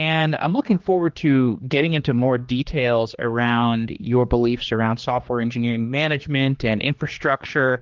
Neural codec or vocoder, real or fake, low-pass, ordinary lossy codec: codec, 16 kHz, 2 kbps, X-Codec, HuBERT features, trained on general audio; fake; 7.2 kHz; Opus, 32 kbps